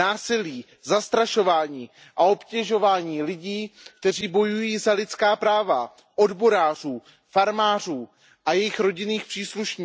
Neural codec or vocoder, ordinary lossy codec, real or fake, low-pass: none; none; real; none